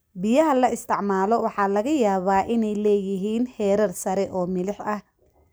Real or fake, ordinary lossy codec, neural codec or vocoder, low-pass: real; none; none; none